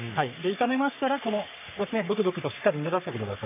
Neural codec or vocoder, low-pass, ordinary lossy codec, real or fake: codec, 44.1 kHz, 3.4 kbps, Pupu-Codec; 3.6 kHz; none; fake